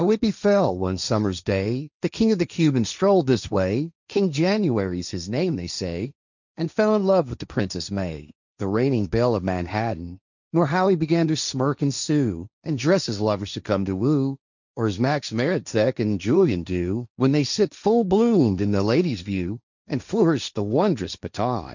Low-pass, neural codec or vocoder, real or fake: 7.2 kHz; codec, 16 kHz, 1.1 kbps, Voila-Tokenizer; fake